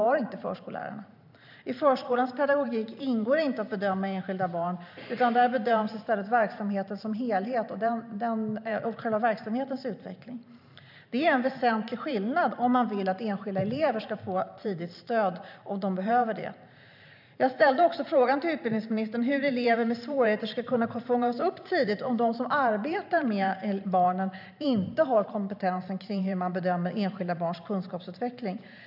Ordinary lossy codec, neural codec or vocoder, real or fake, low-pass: none; vocoder, 44.1 kHz, 128 mel bands every 512 samples, BigVGAN v2; fake; 5.4 kHz